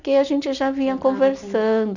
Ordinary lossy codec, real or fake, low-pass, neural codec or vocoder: none; real; 7.2 kHz; none